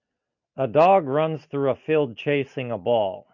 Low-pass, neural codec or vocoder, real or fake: 7.2 kHz; none; real